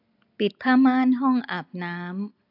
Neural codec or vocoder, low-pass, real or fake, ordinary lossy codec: none; 5.4 kHz; real; AAC, 48 kbps